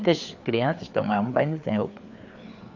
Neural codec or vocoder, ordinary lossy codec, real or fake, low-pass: codec, 16 kHz, 4 kbps, FreqCodec, larger model; none; fake; 7.2 kHz